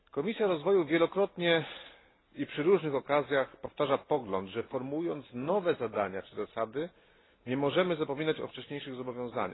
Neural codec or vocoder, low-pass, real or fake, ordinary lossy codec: none; 7.2 kHz; real; AAC, 16 kbps